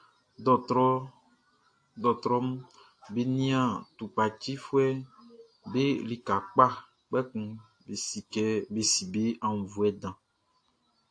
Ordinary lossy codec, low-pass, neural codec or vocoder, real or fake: AAC, 48 kbps; 9.9 kHz; none; real